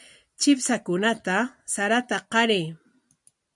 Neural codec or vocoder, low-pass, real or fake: none; 10.8 kHz; real